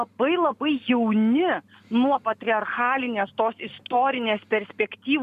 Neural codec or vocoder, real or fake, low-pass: none; real; 14.4 kHz